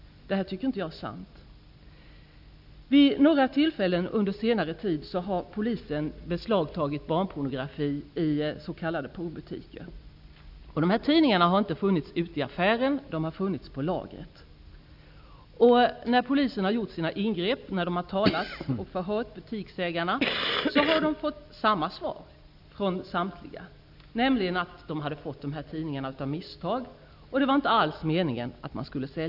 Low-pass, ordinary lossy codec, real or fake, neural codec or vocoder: 5.4 kHz; none; real; none